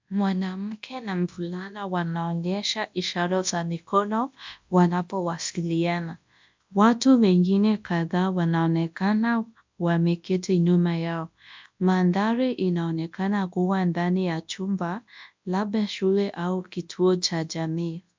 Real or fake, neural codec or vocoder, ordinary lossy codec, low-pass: fake; codec, 24 kHz, 0.9 kbps, WavTokenizer, large speech release; MP3, 64 kbps; 7.2 kHz